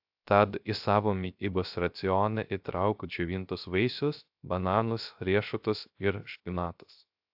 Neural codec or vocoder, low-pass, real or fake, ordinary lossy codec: codec, 16 kHz, 0.3 kbps, FocalCodec; 5.4 kHz; fake; AAC, 48 kbps